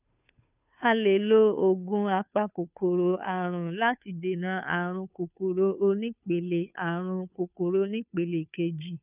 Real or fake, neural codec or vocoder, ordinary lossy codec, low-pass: fake; codec, 16 kHz, 2 kbps, FunCodec, trained on Chinese and English, 25 frames a second; none; 3.6 kHz